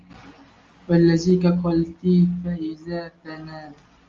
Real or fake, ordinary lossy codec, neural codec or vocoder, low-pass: real; Opus, 32 kbps; none; 7.2 kHz